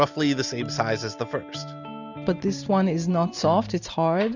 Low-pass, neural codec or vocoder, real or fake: 7.2 kHz; none; real